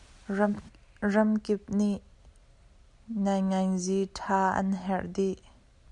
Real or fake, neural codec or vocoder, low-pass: real; none; 10.8 kHz